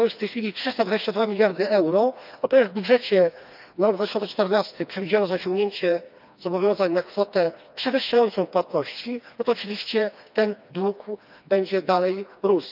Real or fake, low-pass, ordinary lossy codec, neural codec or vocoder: fake; 5.4 kHz; none; codec, 16 kHz, 2 kbps, FreqCodec, smaller model